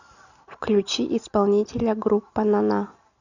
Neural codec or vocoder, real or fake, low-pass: none; real; 7.2 kHz